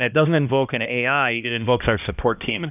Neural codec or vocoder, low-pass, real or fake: codec, 16 kHz, 1 kbps, X-Codec, HuBERT features, trained on balanced general audio; 3.6 kHz; fake